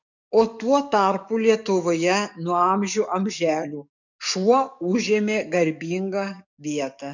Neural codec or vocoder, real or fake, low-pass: codec, 44.1 kHz, 7.8 kbps, DAC; fake; 7.2 kHz